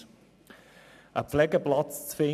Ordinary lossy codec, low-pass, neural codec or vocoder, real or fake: none; 14.4 kHz; none; real